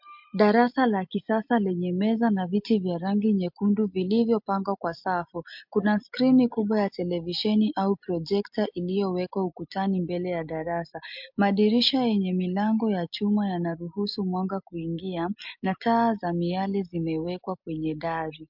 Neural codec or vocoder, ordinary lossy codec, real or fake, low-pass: none; MP3, 48 kbps; real; 5.4 kHz